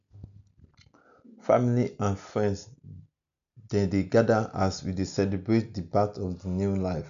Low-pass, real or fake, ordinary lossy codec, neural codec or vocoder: 7.2 kHz; real; none; none